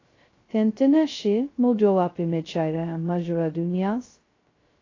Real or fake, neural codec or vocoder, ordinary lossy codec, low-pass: fake; codec, 16 kHz, 0.2 kbps, FocalCodec; AAC, 32 kbps; 7.2 kHz